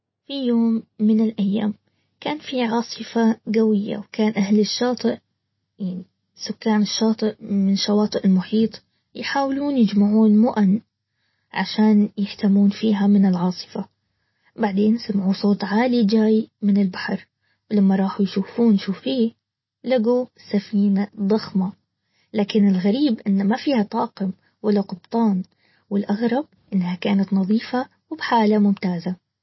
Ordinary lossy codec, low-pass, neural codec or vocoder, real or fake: MP3, 24 kbps; 7.2 kHz; none; real